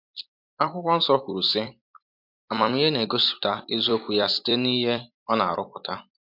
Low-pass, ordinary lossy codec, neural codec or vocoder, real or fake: 5.4 kHz; MP3, 48 kbps; vocoder, 44.1 kHz, 128 mel bands every 256 samples, BigVGAN v2; fake